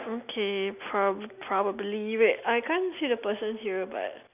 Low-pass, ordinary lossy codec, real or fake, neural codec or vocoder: 3.6 kHz; none; real; none